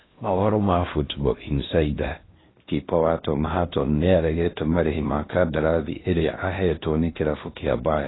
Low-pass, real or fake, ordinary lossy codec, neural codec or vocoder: 7.2 kHz; fake; AAC, 16 kbps; codec, 16 kHz in and 24 kHz out, 0.6 kbps, FocalCodec, streaming, 4096 codes